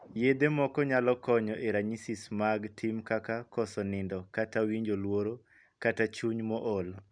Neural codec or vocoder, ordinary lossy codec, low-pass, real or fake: none; none; 9.9 kHz; real